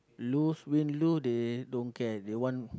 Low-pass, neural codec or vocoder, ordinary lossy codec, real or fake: none; none; none; real